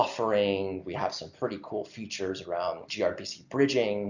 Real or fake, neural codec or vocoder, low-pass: real; none; 7.2 kHz